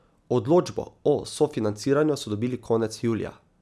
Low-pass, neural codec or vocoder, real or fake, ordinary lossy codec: none; none; real; none